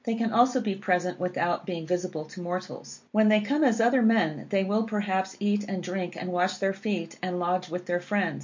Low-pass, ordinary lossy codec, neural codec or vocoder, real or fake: 7.2 kHz; MP3, 48 kbps; none; real